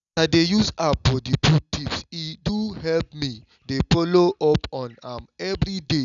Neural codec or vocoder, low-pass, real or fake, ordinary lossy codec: none; 7.2 kHz; real; none